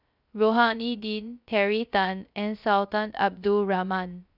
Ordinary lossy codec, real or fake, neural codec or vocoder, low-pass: none; fake; codec, 16 kHz, 0.3 kbps, FocalCodec; 5.4 kHz